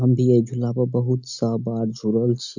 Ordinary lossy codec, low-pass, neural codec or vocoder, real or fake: none; 7.2 kHz; none; real